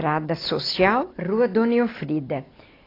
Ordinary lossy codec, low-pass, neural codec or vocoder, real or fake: AAC, 24 kbps; 5.4 kHz; none; real